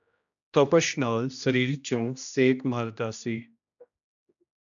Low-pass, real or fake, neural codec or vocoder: 7.2 kHz; fake; codec, 16 kHz, 1 kbps, X-Codec, HuBERT features, trained on general audio